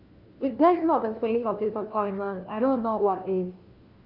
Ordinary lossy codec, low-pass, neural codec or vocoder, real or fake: Opus, 32 kbps; 5.4 kHz; codec, 16 kHz, 1 kbps, FunCodec, trained on LibriTTS, 50 frames a second; fake